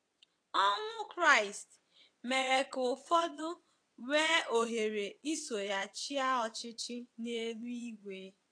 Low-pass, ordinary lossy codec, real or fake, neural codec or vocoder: 9.9 kHz; AAC, 48 kbps; fake; vocoder, 22.05 kHz, 80 mel bands, WaveNeXt